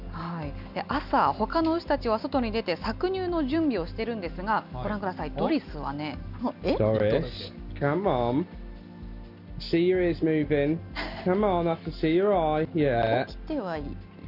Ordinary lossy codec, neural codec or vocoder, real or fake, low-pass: none; none; real; 5.4 kHz